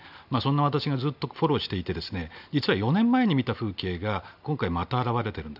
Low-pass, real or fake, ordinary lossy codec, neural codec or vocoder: 5.4 kHz; real; none; none